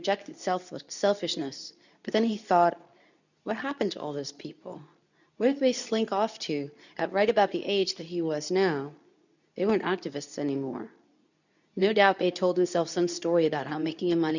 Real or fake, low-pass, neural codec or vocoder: fake; 7.2 kHz; codec, 24 kHz, 0.9 kbps, WavTokenizer, medium speech release version 2